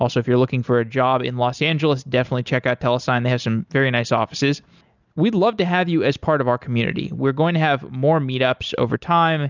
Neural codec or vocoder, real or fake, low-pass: none; real; 7.2 kHz